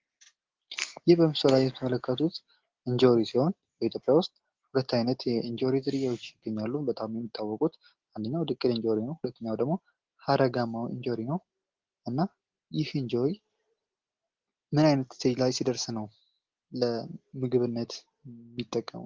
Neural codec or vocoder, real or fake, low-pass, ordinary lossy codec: none; real; 7.2 kHz; Opus, 16 kbps